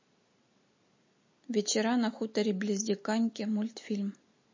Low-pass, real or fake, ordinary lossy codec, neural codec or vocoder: 7.2 kHz; real; MP3, 32 kbps; none